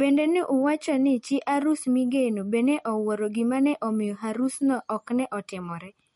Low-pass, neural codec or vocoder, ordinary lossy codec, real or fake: 19.8 kHz; none; MP3, 48 kbps; real